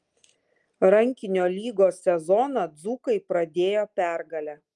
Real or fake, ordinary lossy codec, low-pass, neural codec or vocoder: real; Opus, 32 kbps; 10.8 kHz; none